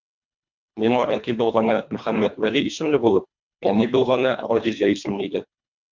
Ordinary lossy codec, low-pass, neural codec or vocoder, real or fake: MP3, 64 kbps; 7.2 kHz; codec, 24 kHz, 1.5 kbps, HILCodec; fake